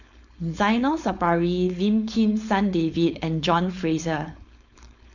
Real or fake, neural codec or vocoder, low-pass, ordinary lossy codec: fake; codec, 16 kHz, 4.8 kbps, FACodec; 7.2 kHz; none